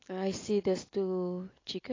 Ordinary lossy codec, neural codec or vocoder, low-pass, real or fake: AAC, 32 kbps; none; 7.2 kHz; real